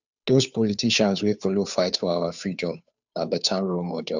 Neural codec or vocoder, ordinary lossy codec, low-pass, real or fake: codec, 16 kHz, 2 kbps, FunCodec, trained on Chinese and English, 25 frames a second; none; 7.2 kHz; fake